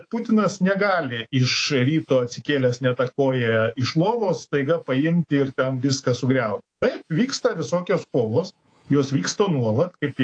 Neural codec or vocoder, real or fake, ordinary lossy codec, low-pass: codec, 24 kHz, 3.1 kbps, DualCodec; fake; AAC, 48 kbps; 9.9 kHz